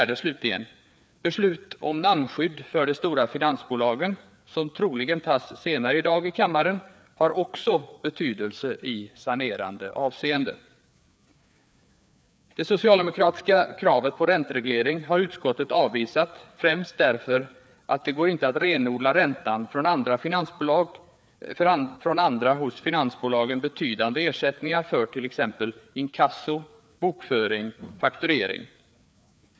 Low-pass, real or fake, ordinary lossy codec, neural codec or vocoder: none; fake; none; codec, 16 kHz, 4 kbps, FreqCodec, larger model